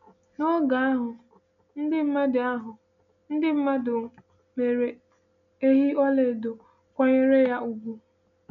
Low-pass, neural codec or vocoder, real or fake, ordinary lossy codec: 7.2 kHz; none; real; none